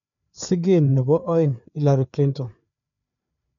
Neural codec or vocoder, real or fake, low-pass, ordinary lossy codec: codec, 16 kHz, 4 kbps, FreqCodec, larger model; fake; 7.2 kHz; MP3, 64 kbps